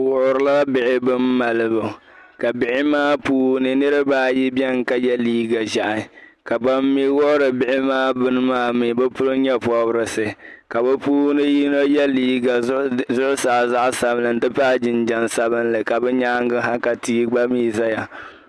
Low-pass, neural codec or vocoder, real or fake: 14.4 kHz; none; real